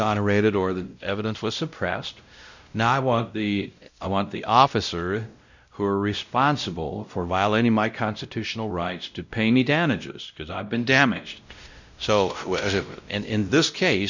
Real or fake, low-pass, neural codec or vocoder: fake; 7.2 kHz; codec, 16 kHz, 0.5 kbps, X-Codec, WavLM features, trained on Multilingual LibriSpeech